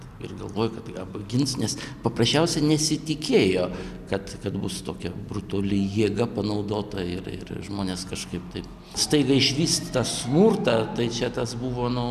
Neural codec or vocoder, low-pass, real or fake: none; 14.4 kHz; real